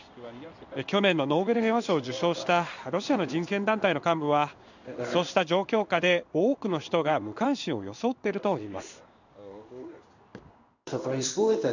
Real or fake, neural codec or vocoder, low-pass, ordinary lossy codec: fake; codec, 16 kHz in and 24 kHz out, 1 kbps, XY-Tokenizer; 7.2 kHz; none